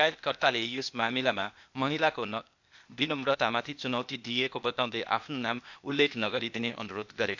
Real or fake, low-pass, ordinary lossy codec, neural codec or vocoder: fake; 7.2 kHz; none; codec, 16 kHz, 0.8 kbps, ZipCodec